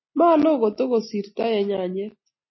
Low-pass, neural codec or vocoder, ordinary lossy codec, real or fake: 7.2 kHz; none; MP3, 24 kbps; real